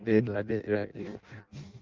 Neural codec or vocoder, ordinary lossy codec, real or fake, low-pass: codec, 16 kHz in and 24 kHz out, 0.6 kbps, FireRedTTS-2 codec; Opus, 32 kbps; fake; 7.2 kHz